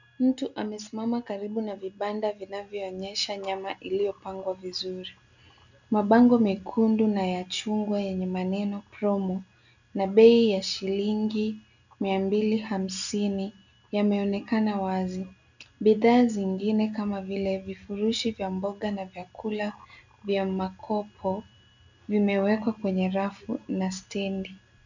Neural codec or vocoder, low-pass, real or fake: none; 7.2 kHz; real